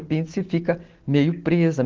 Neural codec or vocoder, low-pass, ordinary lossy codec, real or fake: none; 7.2 kHz; Opus, 16 kbps; real